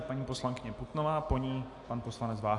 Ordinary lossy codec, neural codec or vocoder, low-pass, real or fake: AAC, 64 kbps; none; 10.8 kHz; real